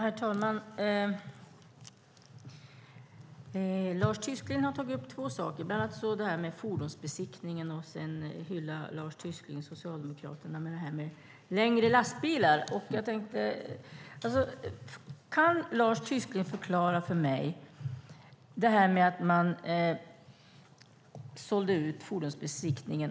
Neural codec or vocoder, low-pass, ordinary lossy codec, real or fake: none; none; none; real